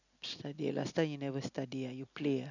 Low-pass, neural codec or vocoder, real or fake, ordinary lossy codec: 7.2 kHz; none; real; none